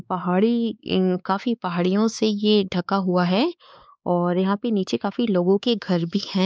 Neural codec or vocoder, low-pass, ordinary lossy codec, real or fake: codec, 16 kHz, 4 kbps, X-Codec, WavLM features, trained on Multilingual LibriSpeech; none; none; fake